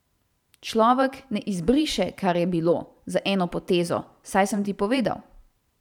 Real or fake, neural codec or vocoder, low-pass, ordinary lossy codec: fake; vocoder, 44.1 kHz, 128 mel bands every 512 samples, BigVGAN v2; 19.8 kHz; none